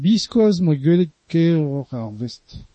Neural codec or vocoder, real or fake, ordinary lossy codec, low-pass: autoencoder, 48 kHz, 32 numbers a frame, DAC-VAE, trained on Japanese speech; fake; MP3, 32 kbps; 10.8 kHz